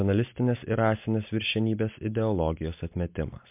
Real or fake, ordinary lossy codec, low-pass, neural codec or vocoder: real; MP3, 32 kbps; 3.6 kHz; none